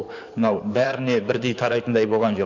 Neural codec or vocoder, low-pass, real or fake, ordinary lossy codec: codec, 16 kHz in and 24 kHz out, 2.2 kbps, FireRedTTS-2 codec; 7.2 kHz; fake; none